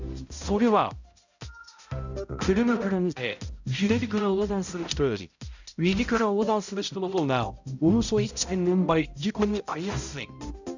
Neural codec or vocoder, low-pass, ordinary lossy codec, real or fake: codec, 16 kHz, 0.5 kbps, X-Codec, HuBERT features, trained on balanced general audio; 7.2 kHz; none; fake